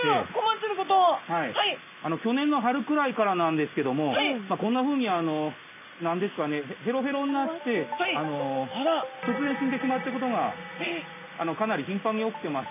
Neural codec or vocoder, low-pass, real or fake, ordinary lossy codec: none; 3.6 kHz; real; none